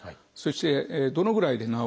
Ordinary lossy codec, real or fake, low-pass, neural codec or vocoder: none; real; none; none